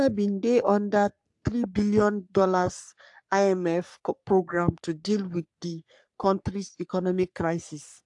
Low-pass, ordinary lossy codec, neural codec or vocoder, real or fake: 10.8 kHz; AAC, 64 kbps; codec, 44.1 kHz, 3.4 kbps, Pupu-Codec; fake